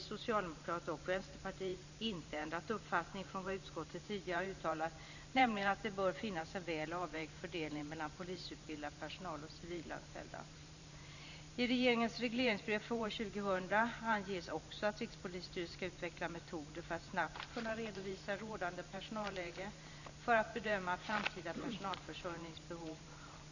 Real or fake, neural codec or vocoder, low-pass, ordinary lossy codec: fake; vocoder, 44.1 kHz, 128 mel bands every 512 samples, BigVGAN v2; 7.2 kHz; none